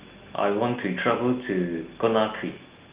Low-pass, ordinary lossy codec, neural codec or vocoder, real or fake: 3.6 kHz; Opus, 32 kbps; none; real